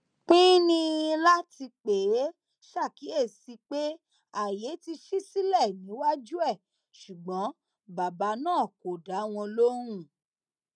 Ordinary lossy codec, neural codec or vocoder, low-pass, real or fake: none; none; 9.9 kHz; real